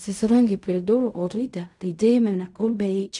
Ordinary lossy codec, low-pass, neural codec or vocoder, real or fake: MP3, 96 kbps; 10.8 kHz; codec, 16 kHz in and 24 kHz out, 0.4 kbps, LongCat-Audio-Codec, fine tuned four codebook decoder; fake